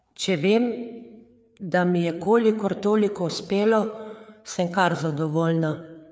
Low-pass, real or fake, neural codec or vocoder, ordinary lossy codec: none; fake; codec, 16 kHz, 4 kbps, FreqCodec, larger model; none